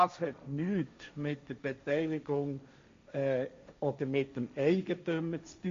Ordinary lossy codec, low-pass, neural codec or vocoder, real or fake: MP3, 48 kbps; 7.2 kHz; codec, 16 kHz, 1.1 kbps, Voila-Tokenizer; fake